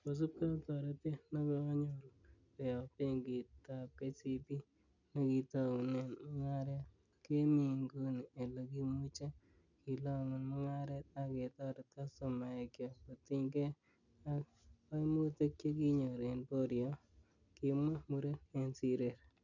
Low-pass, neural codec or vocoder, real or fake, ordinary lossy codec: 7.2 kHz; none; real; none